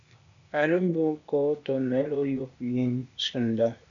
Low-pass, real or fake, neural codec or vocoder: 7.2 kHz; fake; codec, 16 kHz, 0.8 kbps, ZipCodec